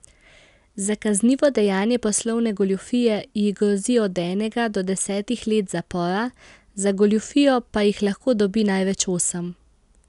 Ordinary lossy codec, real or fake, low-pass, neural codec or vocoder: none; real; 10.8 kHz; none